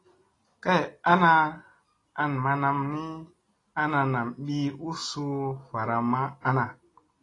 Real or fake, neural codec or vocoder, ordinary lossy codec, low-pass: real; none; AAC, 32 kbps; 10.8 kHz